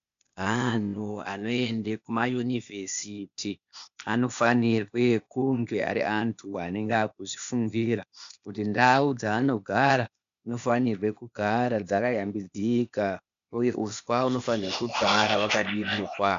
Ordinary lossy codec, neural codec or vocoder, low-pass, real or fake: MP3, 64 kbps; codec, 16 kHz, 0.8 kbps, ZipCodec; 7.2 kHz; fake